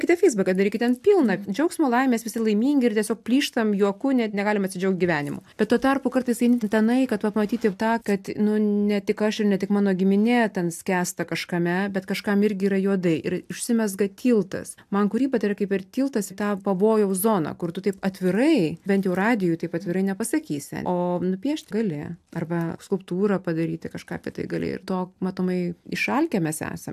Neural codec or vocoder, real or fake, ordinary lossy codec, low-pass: none; real; AAC, 96 kbps; 14.4 kHz